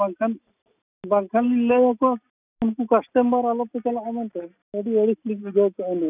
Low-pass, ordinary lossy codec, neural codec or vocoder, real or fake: 3.6 kHz; none; none; real